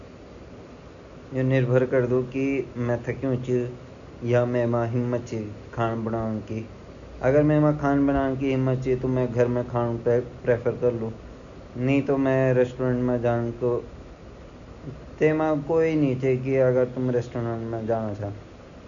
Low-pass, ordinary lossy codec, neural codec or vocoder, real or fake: 7.2 kHz; none; none; real